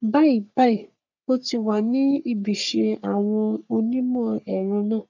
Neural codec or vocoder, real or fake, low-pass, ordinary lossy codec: codec, 44.1 kHz, 3.4 kbps, Pupu-Codec; fake; 7.2 kHz; none